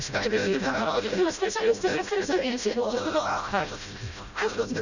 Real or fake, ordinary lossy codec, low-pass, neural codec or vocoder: fake; none; 7.2 kHz; codec, 16 kHz, 0.5 kbps, FreqCodec, smaller model